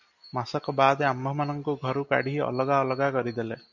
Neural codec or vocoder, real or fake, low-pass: none; real; 7.2 kHz